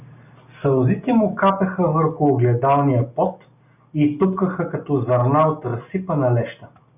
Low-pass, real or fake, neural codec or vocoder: 3.6 kHz; real; none